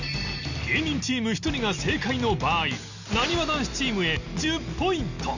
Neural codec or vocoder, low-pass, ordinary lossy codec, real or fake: none; 7.2 kHz; none; real